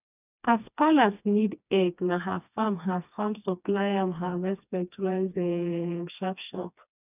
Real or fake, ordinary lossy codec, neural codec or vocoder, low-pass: fake; none; codec, 16 kHz, 2 kbps, FreqCodec, smaller model; 3.6 kHz